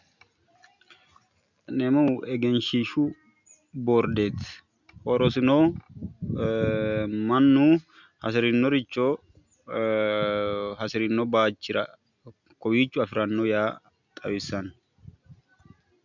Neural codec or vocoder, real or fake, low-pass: none; real; 7.2 kHz